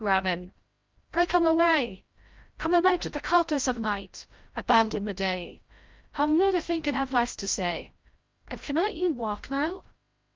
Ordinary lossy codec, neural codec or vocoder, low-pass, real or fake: Opus, 32 kbps; codec, 16 kHz, 0.5 kbps, FreqCodec, larger model; 7.2 kHz; fake